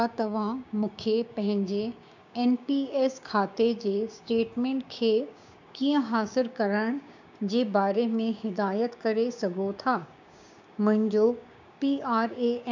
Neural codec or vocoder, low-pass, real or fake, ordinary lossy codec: codec, 16 kHz, 6 kbps, DAC; 7.2 kHz; fake; none